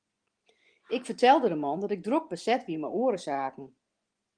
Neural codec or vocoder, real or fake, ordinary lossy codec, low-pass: vocoder, 24 kHz, 100 mel bands, Vocos; fake; Opus, 24 kbps; 9.9 kHz